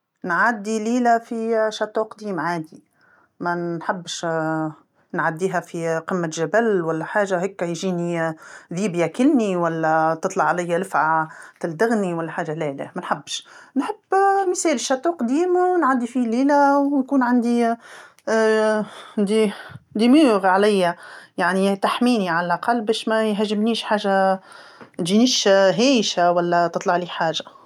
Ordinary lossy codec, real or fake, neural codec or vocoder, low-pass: none; real; none; 19.8 kHz